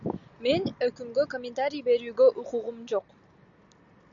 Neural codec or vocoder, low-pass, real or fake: none; 7.2 kHz; real